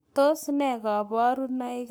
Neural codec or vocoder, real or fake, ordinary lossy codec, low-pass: codec, 44.1 kHz, 7.8 kbps, Pupu-Codec; fake; none; none